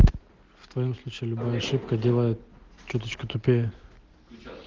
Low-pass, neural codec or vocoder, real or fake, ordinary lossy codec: 7.2 kHz; none; real; Opus, 16 kbps